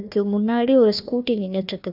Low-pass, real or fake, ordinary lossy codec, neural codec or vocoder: 5.4 kHz; fake; none; codec, 16 kHz, 1 kbps, FunCodec, trained on Chinese and English, 50 frames a second